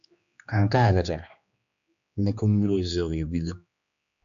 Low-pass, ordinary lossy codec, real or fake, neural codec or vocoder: 7.2 kHz; MP3, 96 kbps; fake; codec, 16 kHz, 2 kbps, X-Codec, HuBERT features, trained on general audio